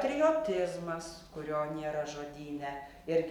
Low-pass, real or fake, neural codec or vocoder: 19.8 kHz; real; none